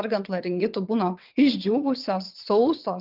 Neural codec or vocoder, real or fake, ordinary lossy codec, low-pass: vocoder, 22.05 kHz, 80 mel bands, WaveNeXt; fake; Opus, 24 kbps; 5.4 kHz